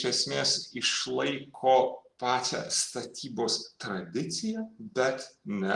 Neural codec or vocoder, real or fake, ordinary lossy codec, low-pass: none; real; Opus, 24 kbps; 10.8 kHz